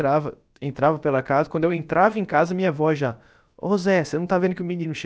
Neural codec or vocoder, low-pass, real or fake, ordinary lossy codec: codec, 16 kHz, about 1 kbps, DyCAST, with the encoder's durations; none; fake; none